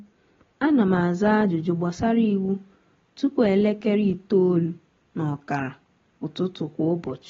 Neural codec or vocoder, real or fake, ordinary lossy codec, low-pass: none; real; AAC, 24 kbps; 7.2 kHz